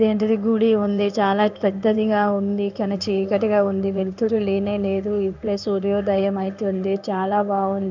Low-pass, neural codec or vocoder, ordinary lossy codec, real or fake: 7.2 kHz; codec, 16 kHz in and 24 kHz out, 1 kbps, XY-Tokenizer; none; fake